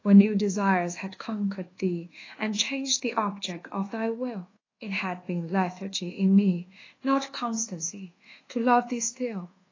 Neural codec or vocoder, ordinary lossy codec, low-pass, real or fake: codec, 24 kHz, 1.2 kbps, DualCodec; AAC, 32 kbps; 7.2 kHz; fake